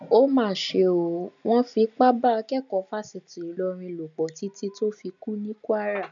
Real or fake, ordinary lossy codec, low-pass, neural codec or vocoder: real; none; 7.2 kHz; none